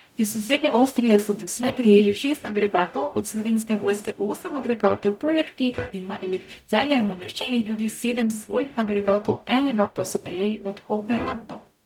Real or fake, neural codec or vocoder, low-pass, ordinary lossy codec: fake; codec, 44.1 kHz, 0.9 kbps, DAC; 19.8 kHz; none